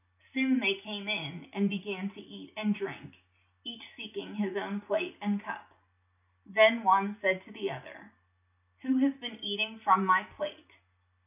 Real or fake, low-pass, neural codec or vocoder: real; 3.6 kHz; none